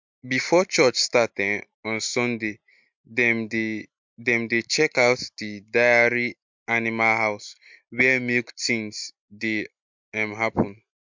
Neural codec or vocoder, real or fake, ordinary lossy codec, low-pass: none; real; MP3, 64 kbps; 7.2 kHz